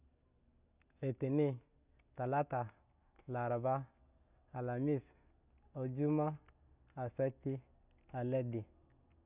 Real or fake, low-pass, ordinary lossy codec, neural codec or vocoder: real; 3.6 kHz; none; none